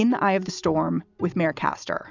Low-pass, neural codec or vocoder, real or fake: 7.2 kHz; none; real